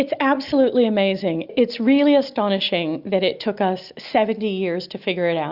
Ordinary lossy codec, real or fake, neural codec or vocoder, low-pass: Opus, 64 kbps; real; none; 5.4 kHz